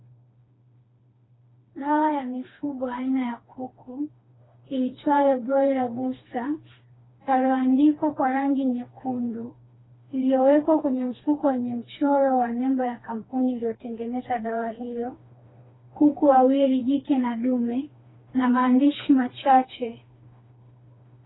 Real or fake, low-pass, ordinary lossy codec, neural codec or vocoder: fake; 7.2 kHz; AAC, 16 kbps; codec, 16 kHz, 2 kbps, FreqCodec, smaller model